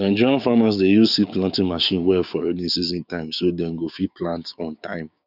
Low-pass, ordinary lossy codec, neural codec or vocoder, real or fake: 5.4 kHz; none; codec, 16 kHz, 6 kbps, DAC; fake